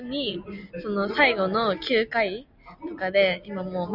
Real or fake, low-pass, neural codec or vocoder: real; 5.4 kHz; none